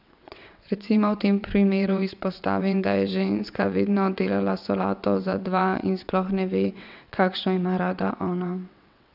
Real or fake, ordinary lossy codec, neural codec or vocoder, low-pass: fake; none; vocoder, 22.05 kHz, 80 mel bands, WaveNeXt; 5.4 kHz